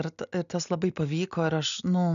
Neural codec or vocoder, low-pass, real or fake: none; 7.2 kHz; real